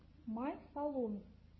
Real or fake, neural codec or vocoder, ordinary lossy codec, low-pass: real; none; MP3, 24 kbps; 7.2 kHz